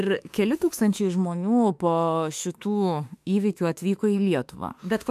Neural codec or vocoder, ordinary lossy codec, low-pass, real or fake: autoencoder, 48 kHz, 32 numbers a frame, DAC-VAE, trained on Japanese speech; MP3, 96 kbps; 14.4 kHz; fake